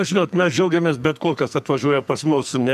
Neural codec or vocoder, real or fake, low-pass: codec, 44.1 kHz, 2.6 kbps, SNAC; fake; 14.4 kHz